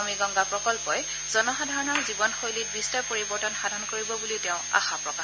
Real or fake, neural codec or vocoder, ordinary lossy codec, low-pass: real; none; none; none